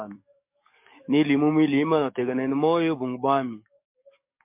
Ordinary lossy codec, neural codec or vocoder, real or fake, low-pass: MP3, 32 kbps; codec, 44.1 kHz, 7.8 kbps, DAC; fake; 3.6 kHz